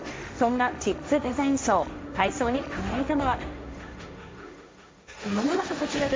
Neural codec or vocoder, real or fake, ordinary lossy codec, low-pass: codec, 16 kHz, 1.1 kbps, Voila-Tokenizer; fake; none; none